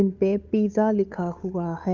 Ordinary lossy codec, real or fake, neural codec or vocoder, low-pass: none; fake; codec, 16 kHz, 8 kbps, FunCodec, trained on Chinese and English, 25 frames a second; 7.2 kHz